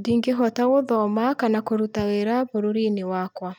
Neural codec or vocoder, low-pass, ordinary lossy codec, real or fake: none; none; none; real